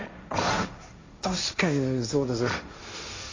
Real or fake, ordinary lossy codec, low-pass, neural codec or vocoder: fake; AAC, 32 kbps; 7.2 kHz; codec, 16 kHz, 1.1 kbps, Voila-Tokenizer